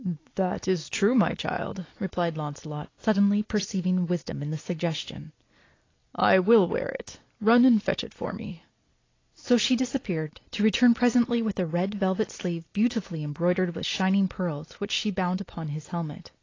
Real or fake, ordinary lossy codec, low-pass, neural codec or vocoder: real; AAC, 32 kbps; 7.2 kHz; none